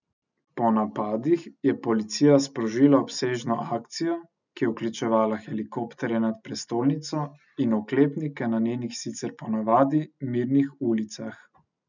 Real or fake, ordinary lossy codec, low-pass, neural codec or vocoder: real; none; 7.2 kHz; none